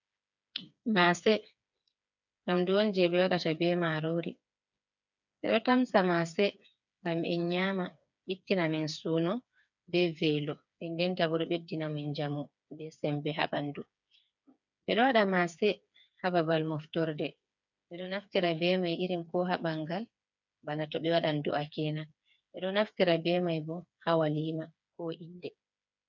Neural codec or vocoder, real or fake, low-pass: codec, 16 kHz, 4 kbps, FreqCodec, smaller model; fake; 7.2 kHz